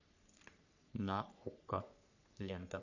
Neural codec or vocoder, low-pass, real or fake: codec, 44.1 kHz, 3.4 kbps, Pupu-Codec; 7.2 kHz; fake